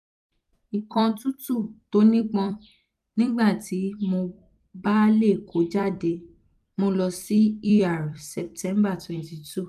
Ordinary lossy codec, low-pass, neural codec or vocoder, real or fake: none; 14.4 kHz; vocoder, 44.1 kHz, 128 mel bands every 256 samples, BigVGAN v2; fake